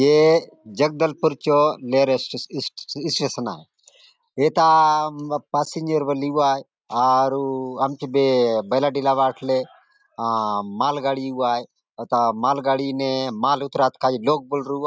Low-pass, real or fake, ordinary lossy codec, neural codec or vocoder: none; real; none; none